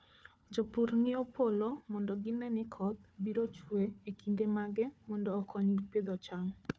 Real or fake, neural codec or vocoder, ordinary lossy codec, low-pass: fake; codec, 16 kHz, 4 kbps, FunCodec, trained on Chinese and English, 50 frames a second; none; none